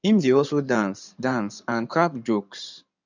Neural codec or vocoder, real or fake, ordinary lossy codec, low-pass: codec, 16 kHz in and 24 kHz out, 2.2 kbps, FireRedTTS-2 codec; fake; none; 7.2 kHz